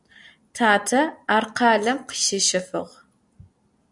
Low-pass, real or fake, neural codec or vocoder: 10.8 kHz; real; none